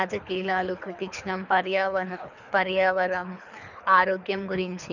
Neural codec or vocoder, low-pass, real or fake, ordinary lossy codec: codec, 24 kHz, 3 kbps, HILCodec; 7.2 kHz; fake; none